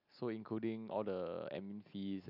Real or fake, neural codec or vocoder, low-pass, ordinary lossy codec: real; none; 5.4 kHz; none